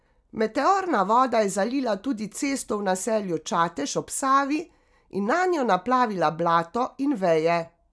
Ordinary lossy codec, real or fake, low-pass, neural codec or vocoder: none; real; none; none